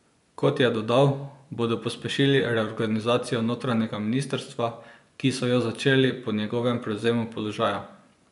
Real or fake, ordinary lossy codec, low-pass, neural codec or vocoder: fake; none; 10.8 kHz; vocoder, 24 kHz, 100 mel bands, Vocos